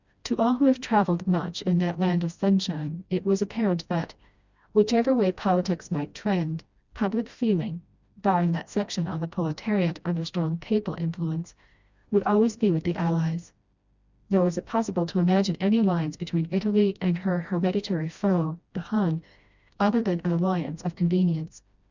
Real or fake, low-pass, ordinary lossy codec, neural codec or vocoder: fake; 7.2 kHz; Opus, 64 kbps; codec, 16 kHz, 1 kbps, FreqCodec, smaller model